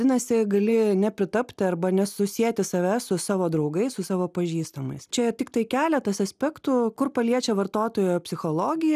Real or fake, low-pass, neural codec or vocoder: real; 14.4 kHz; none